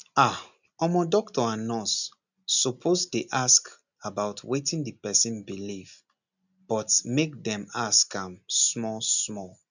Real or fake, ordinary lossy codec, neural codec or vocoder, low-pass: real; none; none; 7.2 kHz